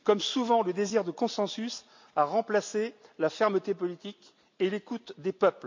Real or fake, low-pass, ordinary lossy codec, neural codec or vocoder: real; 7.2 kHz; MP3, 48 kbps; none